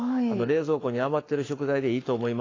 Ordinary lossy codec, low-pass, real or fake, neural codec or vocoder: AAC, 32 kbps; 7.2 kHz; fake; codec, 44.1 kHz, 7.8 kbps, Pupu-Codec